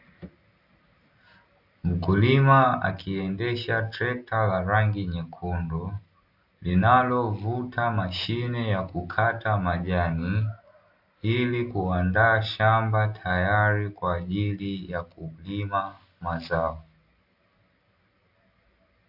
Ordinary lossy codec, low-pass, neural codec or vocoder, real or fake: AAC, 48 kbps; 5.4 kHz; none; real